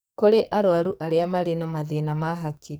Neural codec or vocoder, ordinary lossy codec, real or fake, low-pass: codec, 44.1 kHz, 2.6 kbps, SNAC; none; fake; none